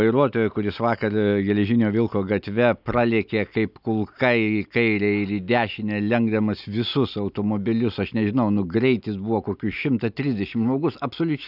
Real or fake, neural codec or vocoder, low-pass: real; none; 5.4 kHz